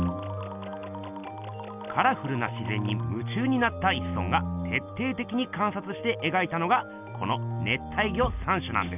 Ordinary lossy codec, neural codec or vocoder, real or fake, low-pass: none; none; real; 3.6 kHz